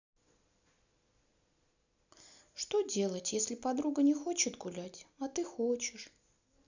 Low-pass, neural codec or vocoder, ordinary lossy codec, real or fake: 7.2 kHz; none; none; real